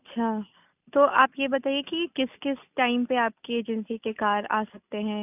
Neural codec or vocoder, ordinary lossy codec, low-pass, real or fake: none; none; 3.6 kHz; real